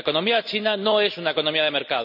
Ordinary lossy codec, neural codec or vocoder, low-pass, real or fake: none; none; 5.4 kHz; real